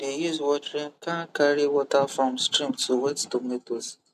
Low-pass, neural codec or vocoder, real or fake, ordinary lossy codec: 14.4 kHz; none; real; none